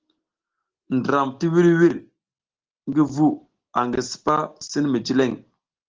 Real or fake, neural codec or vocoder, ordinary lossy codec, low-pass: real; none; Opus, 16 kbps; 7.2 kHz